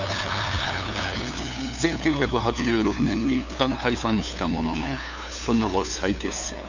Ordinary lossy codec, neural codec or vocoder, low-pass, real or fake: none; codec, 16 kHz, 2 kbps, FunCodec, trained on LibriTTS, 25 frames a second; 7.2 kHz; fake